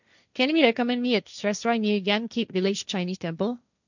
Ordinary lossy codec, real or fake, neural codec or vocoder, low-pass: none; fake; codec, 16 kHz, 1.1 kbps, Voila-Tokenizer; 7.2 kHz